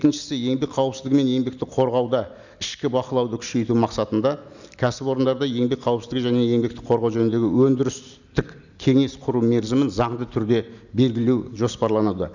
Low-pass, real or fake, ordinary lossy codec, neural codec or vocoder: 7.2 kHz; real; none; none